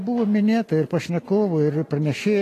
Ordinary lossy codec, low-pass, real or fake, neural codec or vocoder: AAC, 48 kbps; 14.4 kHz; real; none